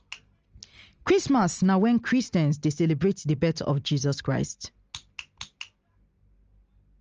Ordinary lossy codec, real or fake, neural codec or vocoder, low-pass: Opus, 24 kbps; real; none; 7.2 kHz